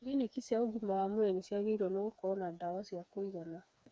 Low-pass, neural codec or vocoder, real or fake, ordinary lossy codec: none; codec, 16 kHz, 4 kbps, FreqCodec, smaller model; fake; none